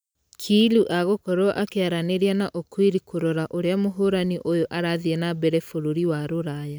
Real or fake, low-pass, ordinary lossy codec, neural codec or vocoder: real; none; none; none